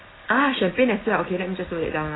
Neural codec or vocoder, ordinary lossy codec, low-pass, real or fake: none; AAC, 16 kbps; 7.2 kHz; real